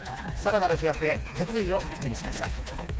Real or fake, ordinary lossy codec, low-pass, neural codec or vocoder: fake; none; none; codec, 16 kHz, 2 kbps, FreqCodec, smaller model